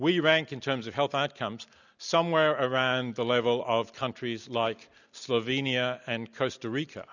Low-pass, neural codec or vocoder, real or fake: 7.2 kHz; none; real